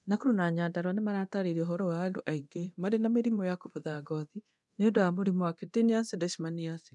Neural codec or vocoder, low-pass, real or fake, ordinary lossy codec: codec, 24 kHz, 0.9 kbps, DualCodec; 10.8 kHz; fake; none